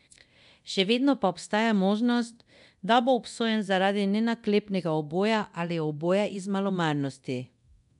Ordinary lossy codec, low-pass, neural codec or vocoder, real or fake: none; 10.8 kHz; codec, 24 kHz, 0.9 kbps, DualCodec; fake